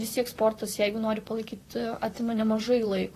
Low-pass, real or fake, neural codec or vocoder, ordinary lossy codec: 14.4 kHz; fake; vocoder, 44.1 kHz, 128 mel bands, Pupu-Vocoder; AAC, 48 kbps